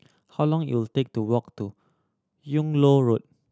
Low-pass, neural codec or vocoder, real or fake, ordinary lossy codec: none; none; real; none